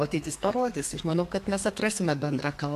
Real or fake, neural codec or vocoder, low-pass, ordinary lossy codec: fake; codec, 32 kHz, 1.9 kbps, SNAC; 14.4 kHz; AAC, 64 kbps